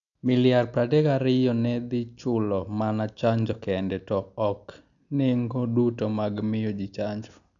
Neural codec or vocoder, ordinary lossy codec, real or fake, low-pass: none; none; real; 7.2 kHz